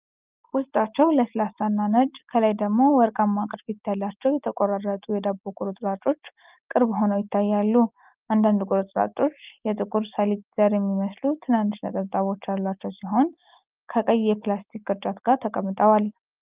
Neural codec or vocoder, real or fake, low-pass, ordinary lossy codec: none; real; 3.6 kHz; Opus, 32 kbps